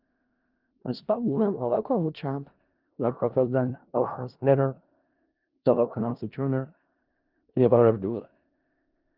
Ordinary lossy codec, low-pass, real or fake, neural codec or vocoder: Opus, 32 kbps; 5.4 kHz; fake; codec, 16 kHz in and 24 kHz out, 0.4 kbps, LongCat-Audio-Codec, four codebook decoder